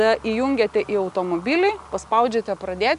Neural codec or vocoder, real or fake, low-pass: none; real; 10.8 kHz